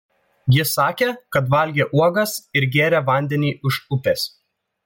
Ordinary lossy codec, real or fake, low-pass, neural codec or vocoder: MP3, 64 kbps; real; 19.8 kHz; none